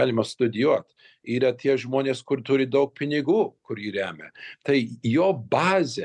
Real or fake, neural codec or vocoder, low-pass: real; none; 10.8 kHz